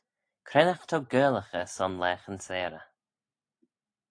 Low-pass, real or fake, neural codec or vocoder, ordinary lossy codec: 9.9 kHz; real; none; AAC, 48 kbps